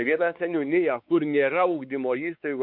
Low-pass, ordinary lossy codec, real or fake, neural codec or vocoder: 5.4 kHz; MP3, 48 kbps; fake; codec, 16 kHz, 4 kbps, X-Codec, WavLM features, trained on Multilingual LibriSpeech